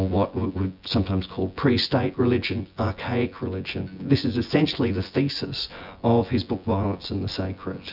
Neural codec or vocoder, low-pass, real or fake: vocoder, 24 kHz, 100 mel bands, Vocos; 5.4 kHz; fake